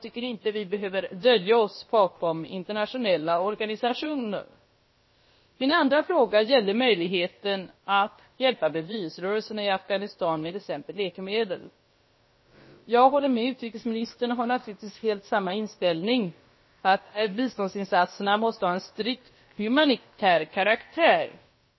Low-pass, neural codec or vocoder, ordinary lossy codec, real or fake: 7.2 kHz; codec, 16 kHz, about 1 kbps, DyCAST, with the encoder's durations; MP3, 24 kbps; fake